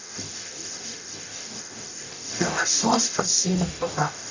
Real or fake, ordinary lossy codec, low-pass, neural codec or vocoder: fake; AAC, 48 kbps; 7.2 kHz; codec, 44.1 kHz, 0.9 kbps, DAC